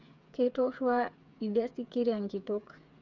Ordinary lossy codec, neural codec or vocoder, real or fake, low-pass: none; codec, 16 kHz, 8 kbps, FreqCodec, smaller model; fake; 7.2 kHz